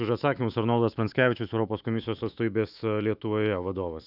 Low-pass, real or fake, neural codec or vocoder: 5.4 kHz; fake; autoencoder, 48 kHz, 128 numbers a frame, DAC-VAE, trained on Japanese speech